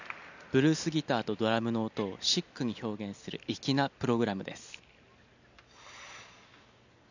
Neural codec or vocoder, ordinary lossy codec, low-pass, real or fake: none; none; 7.2 kHz; real